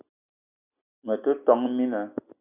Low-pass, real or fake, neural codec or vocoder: 3.6 kHz; real; none